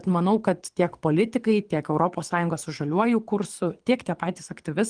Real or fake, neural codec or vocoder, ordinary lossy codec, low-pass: fake; codec, 24 kHz, 6 kbps, HILCodec; Opus, 24 kbps; 9.9 kHz